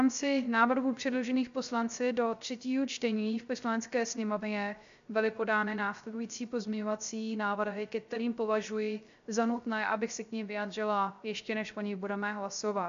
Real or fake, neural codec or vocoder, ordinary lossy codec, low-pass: fake; codec, 16 kHz, 0.3 kbps, FocalCodec; MP3, 64 kbps; 7.2 kHz